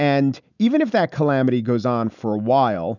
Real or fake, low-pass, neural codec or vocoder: real; 7.2 kHz; none